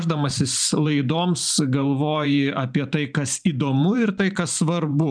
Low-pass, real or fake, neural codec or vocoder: 9.9 kHz; fake; vocoder, 44.1 kHz, 128 mel bands every 512 samples, BigVGAN v2